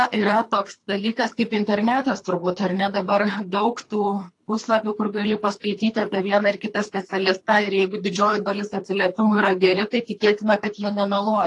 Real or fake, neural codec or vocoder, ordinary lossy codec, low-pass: fake; codec, 24 kHz, 3 kbps, HILCodec; AAC, 48 kbps; 10.8 kHz